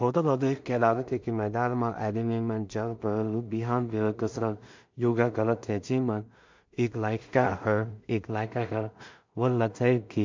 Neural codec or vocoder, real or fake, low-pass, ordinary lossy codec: codec, 16 kHz in and 24 kHz out, 0.4 kbps, LongCat-Audio-Codec, two codebook decoder; fake; 7.2 kHz; MP3, 64 kbps